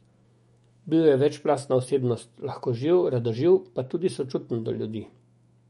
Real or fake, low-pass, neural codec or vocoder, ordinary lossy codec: fake; 19.8 kHz; autoencoder, 48 kHz, 128 numbers a frame, DAC-VAE, trained on Japanese speech; MP3, 48 kbps